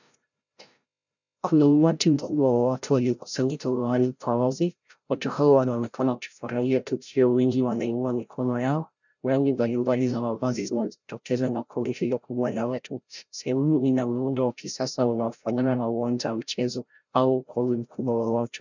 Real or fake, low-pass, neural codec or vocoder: fake; 7.2 kHz; codec, 16 kHz, 0.5 kbps, FreqCodec, larger model